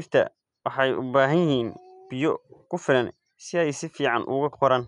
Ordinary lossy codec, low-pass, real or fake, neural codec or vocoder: none; 10.8 kHz; real; none